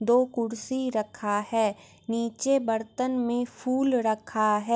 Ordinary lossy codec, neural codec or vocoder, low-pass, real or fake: none; none; none; real